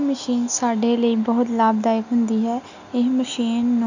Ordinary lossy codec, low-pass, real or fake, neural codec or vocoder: none; 7.2 kHz; real; none